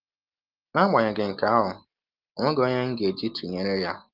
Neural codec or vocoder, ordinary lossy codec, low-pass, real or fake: none; Opus, 32 kbps; 5.4 kHz; real